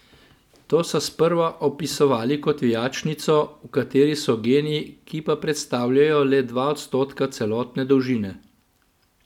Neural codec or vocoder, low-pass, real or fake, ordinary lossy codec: none; 19.8 kHz; real; none